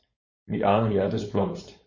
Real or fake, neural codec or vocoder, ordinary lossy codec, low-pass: fake; codec, 16 kHz, 4.8 kbps, FACodec; MP3, 32 kbps; 7.2 kHz